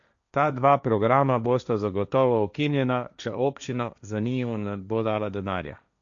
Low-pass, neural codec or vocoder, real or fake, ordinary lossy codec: 7.2 kHz; codec, 16 kHz, 1.1 kbps, Voila-Tokenizer; fake; none